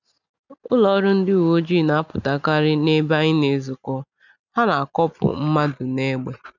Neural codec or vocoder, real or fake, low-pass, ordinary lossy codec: none; real; 7.2 kHz; none